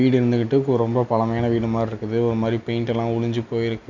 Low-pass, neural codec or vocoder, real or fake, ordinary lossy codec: 7.2 kHz; none; real; none